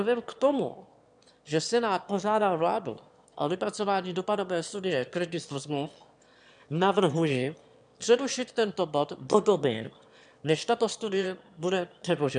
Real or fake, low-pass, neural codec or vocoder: fake; 9.9 kHz; autoencoder, 22.05 kHz, a latent of 192 numbers a frame, VITS, trained on one speaker